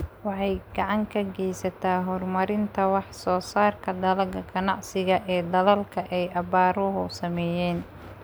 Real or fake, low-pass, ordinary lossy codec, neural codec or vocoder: real; none; none; none